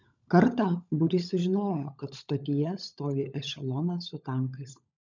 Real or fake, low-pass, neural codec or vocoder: fake; 7.2 kHz; codec, 16 kHz, 16 kbps, FunCodec, trained on LibriTTS, 50 frames a second